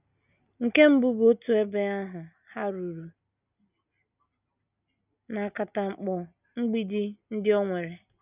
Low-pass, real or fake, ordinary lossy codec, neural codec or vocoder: 3.6 kHz; real; none; none